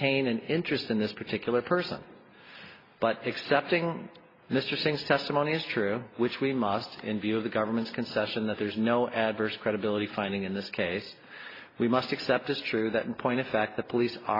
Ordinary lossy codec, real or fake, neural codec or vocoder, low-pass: AAC, 24 kbps; real; none; 5.4 kHz